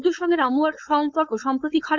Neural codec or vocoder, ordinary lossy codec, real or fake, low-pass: codec, 16 kHz, 4.8 kbps, FACodec; none; fake; none